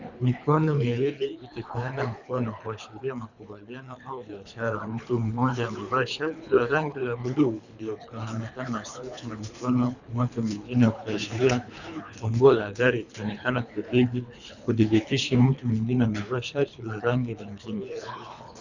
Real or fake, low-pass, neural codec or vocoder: fake; 7.2 kHz; codec, 24 kHz, 3 kbps, HILCodec